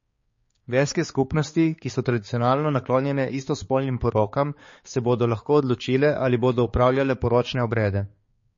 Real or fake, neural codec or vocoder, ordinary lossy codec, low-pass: fake; codec, 16 kHz, 4 kbps, X-Codec, HuBERT features, trained on balanced general audio; MP3, 32 kbps; 7.2 kHz